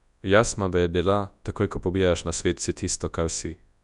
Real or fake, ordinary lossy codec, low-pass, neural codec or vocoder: fake; none; 10.8 kHz; codec, 24 kHz, 0.9 kbps, WavTokenizer, large speech release